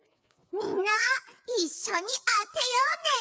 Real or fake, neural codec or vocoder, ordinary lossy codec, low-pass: fake; codec, 16 kHz, 4 kbps, FreqCodec, larger model; none; none